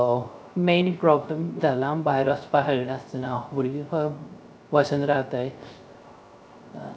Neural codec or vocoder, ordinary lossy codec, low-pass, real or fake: codec, 16 kHz, 0.3 kbps, FocalCodec; none; none; fake